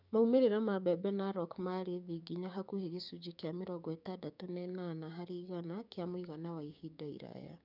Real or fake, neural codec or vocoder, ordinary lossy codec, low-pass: fake; codec, 16 kHz, 6 kbps, DAC; none; 5.4 kHz